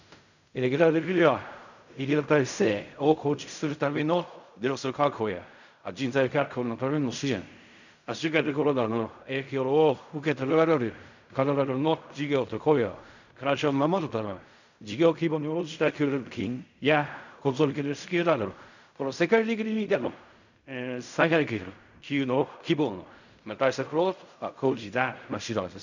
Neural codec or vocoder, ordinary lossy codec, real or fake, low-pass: codec, 16 kHz in and 24 kHz out, 0.4 kbps, LongCat-Audio-Codec, fine tuned four codebook decoder; none; fake; 7.2 kHz